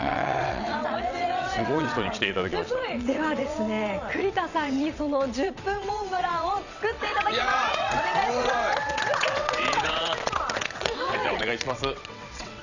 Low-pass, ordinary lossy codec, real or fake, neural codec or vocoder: 7.2 kHz; none; fake; vocoder, 22.05 kHz, 80 mel bands, WaveNeXt